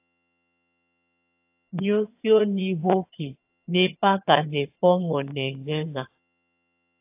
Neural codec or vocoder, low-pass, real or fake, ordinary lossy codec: vocoder, 22.05 kHz, 80 mel bands, HiFi-GAN; 3.6 kHz; fake; AAC, 32 kbps